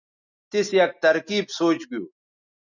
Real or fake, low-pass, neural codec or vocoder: real; 7.2 kHz; none